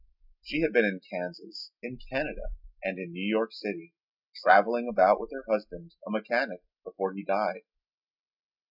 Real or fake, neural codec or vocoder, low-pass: real; none; 5.4 kHz